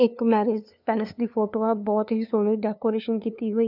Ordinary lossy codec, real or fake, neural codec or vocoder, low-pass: none; fake; codec, 16 kHz, 2 kbps, FunCodec, trained on LibriTTS, 25 frames a second; 5.4 kHz